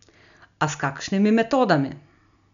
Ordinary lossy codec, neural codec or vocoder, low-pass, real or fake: none; none; 7.2 kHz; real